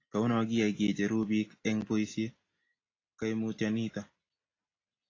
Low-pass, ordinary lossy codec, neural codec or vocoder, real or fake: 7.2 kHz; AAC, 32 kbps; none; real